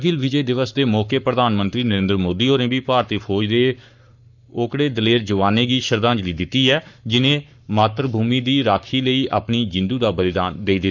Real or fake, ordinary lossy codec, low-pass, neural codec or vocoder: fake; none; 7.2 kHz; codec, 44.1 kHz, 7.8 kbps, Pupu-Codec